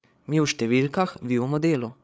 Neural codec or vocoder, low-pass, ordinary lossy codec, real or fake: codec, 16 kHz, 8 kbps, FreqCodec, larger model; none; none; fake